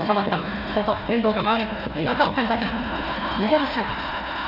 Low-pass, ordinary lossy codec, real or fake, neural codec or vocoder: 5.4 kHz; none; fake; codec, 16 kHz, 1 kbps, FunCodec, trained on Chinese and English, 50 frames a second